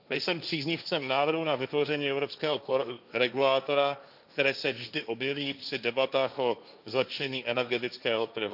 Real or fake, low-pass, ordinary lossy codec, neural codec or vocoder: fake; 5.4 kHz; none; codec, 16 kHz, 1.1 kbps, Voila-Tokenizer